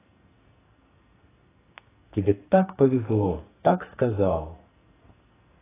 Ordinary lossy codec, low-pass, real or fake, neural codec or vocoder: AAC, 16 kbps; 3.6 kHz; fake; codec, 44.1 kHz, 2.6 kbps, SNAC